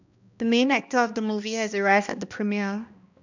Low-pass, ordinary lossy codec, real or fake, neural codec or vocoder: 7.2 kHz; none; fake; codec, 16 kHz, 1 kbps, X-Codec, HuBERT features, trained on balanced general audio